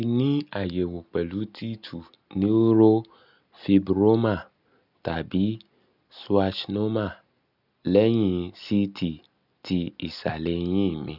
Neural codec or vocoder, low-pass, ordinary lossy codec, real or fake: none; 5.4 kHz; none; real